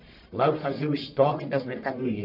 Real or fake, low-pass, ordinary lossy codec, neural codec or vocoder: fake; 5.4 kHz; none; codec, 44.1 kHz, 1.7 kbps, Pupu-Codec